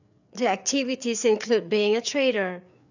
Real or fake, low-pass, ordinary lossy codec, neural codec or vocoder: fake; 7.2 kHz; none; codec, 16 kHz, 4 kbps, FreqCodec, larger model